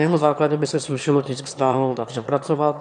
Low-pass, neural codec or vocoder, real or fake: 9.9 kHz; autoencoder, 22.05 kHz, a latent of 192 numbers a frame, VITS, trained on one speaker; fake